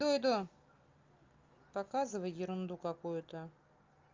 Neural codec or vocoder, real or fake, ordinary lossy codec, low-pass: none; real; Opus, 32 kbps; 7.2 kHz